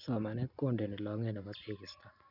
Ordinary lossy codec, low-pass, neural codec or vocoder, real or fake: none; 5.4 kHz; none; real